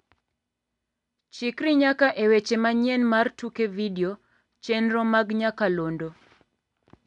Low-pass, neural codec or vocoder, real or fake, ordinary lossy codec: 10.8 kHz; none; real; MP3, 96 kbps